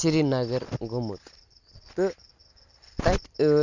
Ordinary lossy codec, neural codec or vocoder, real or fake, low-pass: none; none; real; 7.2 kHz